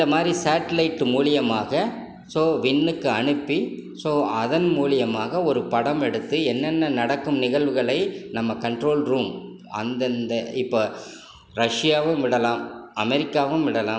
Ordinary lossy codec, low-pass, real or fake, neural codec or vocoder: none; none; real; none